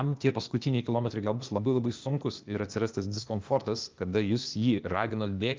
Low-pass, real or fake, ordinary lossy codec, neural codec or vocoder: 7.2 kHz; fake; Opus, 24 kbps; codec, 16 kHz, 0.8 kbps, ZipCodec